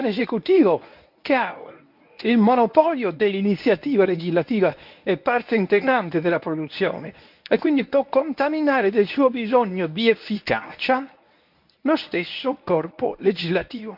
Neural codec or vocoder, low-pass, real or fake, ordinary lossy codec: codec, 24 kHz, 0.9 kbps, WavTokenizer, medium speech release version 1; 5.4 kHz; fake; none